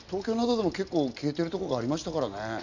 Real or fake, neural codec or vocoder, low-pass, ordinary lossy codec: real; none; 7.2 kHz; none